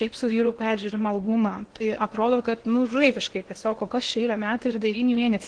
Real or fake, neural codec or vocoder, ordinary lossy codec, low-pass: fake; codec, 16 kHz in and 24 kHz out, 0.8 kbps, FocalCodec, streaming, 65536 codes; Opus, 16 kbps; 9.9 kHz